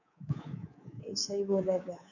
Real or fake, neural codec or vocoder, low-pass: fake; codec, 24 kHz, 3.1 kbps, DualCodec; 7.2 kHz